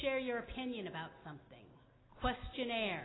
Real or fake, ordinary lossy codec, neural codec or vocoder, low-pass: real; AAC, 16 kbps; none; 7.2 kHz